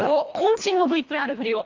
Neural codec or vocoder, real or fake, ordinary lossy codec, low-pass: codec, 24 kHz, 1.5 kbps, HILCodec; fake; Opus, 32 kbps; 7.2 kHz